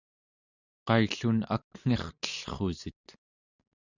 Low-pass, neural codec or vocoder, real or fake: 7.2 kHz; none; real